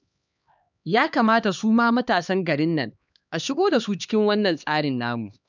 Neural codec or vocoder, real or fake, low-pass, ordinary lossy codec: codec, 16 kHz, 2 kbps, X-Codec, HuBERT features, trained on LibriSpeech; fake; 7.2 kHz; none